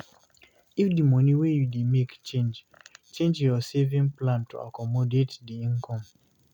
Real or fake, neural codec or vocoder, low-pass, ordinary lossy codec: real; none; 19.8 kHz; none